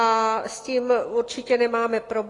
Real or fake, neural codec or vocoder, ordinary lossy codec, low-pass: real; none; AAC, 32 kbps; 10.8 kHz